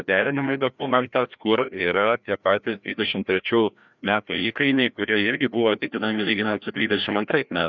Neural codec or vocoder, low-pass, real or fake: codec, 16 kHz, 1 kbps, FreqCodec, larger model; 7.2 kHz; fake